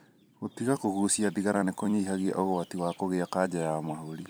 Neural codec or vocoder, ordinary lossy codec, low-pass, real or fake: vocoder, 44.1 kHz, 128 mel bands every 256 samples, BigVGAN v2; none; none; fake